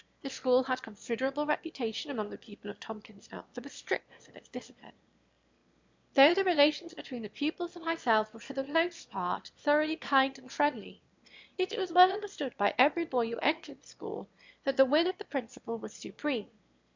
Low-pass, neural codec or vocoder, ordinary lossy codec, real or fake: 7.2 kHz; autoencoder, 22.05 kHz, a latent of 192 numbers a frame, VITS, trained on one speaker; MP3, 64 kbps; fake